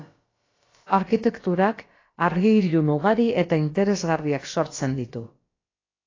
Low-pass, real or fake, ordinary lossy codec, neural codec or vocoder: 7.2 kHz; fake; AAC, 32 kbps; codec, 16 kHz, about 1 kbps, DyCAST, with the encoder's durations